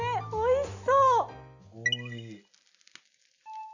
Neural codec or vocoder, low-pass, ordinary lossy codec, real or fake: none; 7.2 kHz; none; real